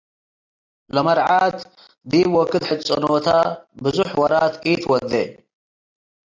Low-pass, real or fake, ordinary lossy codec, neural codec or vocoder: 7.2 kHz; real; AAC, 48 kbps; none